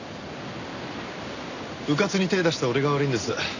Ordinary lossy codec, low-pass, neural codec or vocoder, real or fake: none; 7.2 kHz; none; real